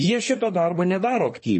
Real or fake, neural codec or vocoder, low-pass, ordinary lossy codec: fake; codec, 24 kHz, 1 kbps, SNAC; 10.8 kHz; MP3, 32 kbps